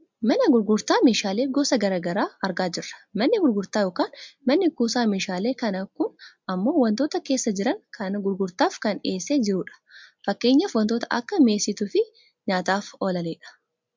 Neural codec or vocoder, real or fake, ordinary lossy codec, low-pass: none; real; MP3, 64 kbps; 7.2 kHz